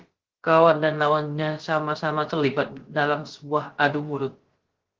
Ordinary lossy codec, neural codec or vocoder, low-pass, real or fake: Opus, 16 kbps; codec, 16 kHz, about 1 kbps, DyCAST, with the encoder's durations; 7.2 kHz; fake